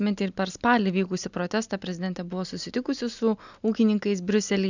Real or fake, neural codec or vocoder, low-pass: real; none; 7.2 kHz